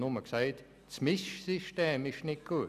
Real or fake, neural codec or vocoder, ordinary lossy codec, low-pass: real; none; none; 14.4 kHz